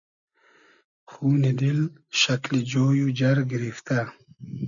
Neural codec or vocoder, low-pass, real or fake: none; 7.2 kHz; real